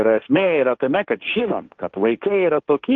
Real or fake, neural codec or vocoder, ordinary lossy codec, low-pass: fake; codec, 16 kHz, 1.1 kbps, Voila-Tokenizer; Opus, 32 kbps; 7.2 kHz